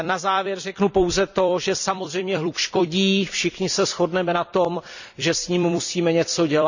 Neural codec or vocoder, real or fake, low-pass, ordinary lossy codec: vocoder, 44.1 kHz, 128 mel bands every 256 samples, BigVGAN v2; fake; 7.2 kHz; none